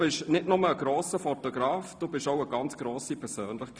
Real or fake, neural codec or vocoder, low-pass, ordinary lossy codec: real; none; none; none